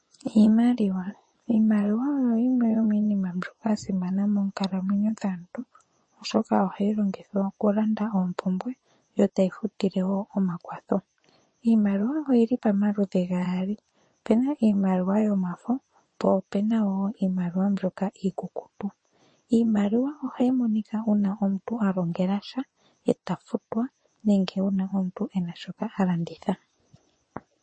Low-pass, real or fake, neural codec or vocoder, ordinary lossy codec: 9.9 kHz; fake; vocoder, 24 kHz, 100 mel bands, Vocos; MP3, 32 kbps